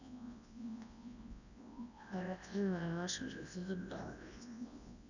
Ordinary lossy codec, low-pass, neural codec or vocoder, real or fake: none; 7.2 kHz; codec, 24 kHz, 0.9 kbps, WavTokenizer, large speech release; fake